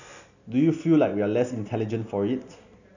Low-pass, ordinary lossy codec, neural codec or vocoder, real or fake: 7.2 kHz; none; none; real